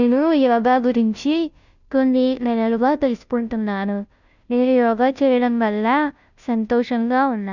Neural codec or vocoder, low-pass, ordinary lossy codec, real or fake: codec, 16 kHz, 0.5 kbps, FunCodec, trained on Chinese and English, 25 frames a second; 7.2 kHz; none; fake